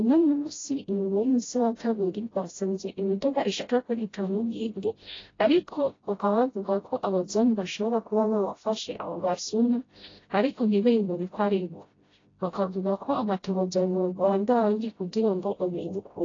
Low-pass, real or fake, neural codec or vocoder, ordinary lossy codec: 7.2 kHz; fake; codec, 16 kHz, 0.5 kbps, FreqCodec, smaller model; AAC, 32 kbps